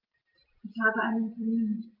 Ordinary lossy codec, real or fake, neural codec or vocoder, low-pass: Opus, 32 kbps; real; none; 5.4 kHz